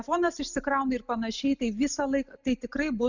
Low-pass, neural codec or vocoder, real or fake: 7.2 kHz; none; real